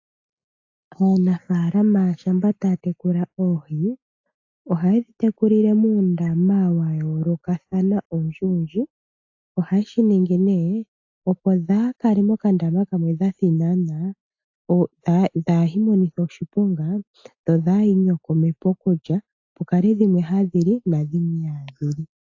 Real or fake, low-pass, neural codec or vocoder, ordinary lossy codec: real; 7.2 kHz; none; AAC, 48 kbps